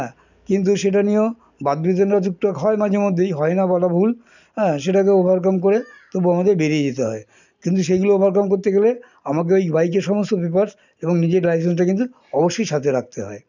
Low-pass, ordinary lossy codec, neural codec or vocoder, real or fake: 7.2 kHz; none; none; real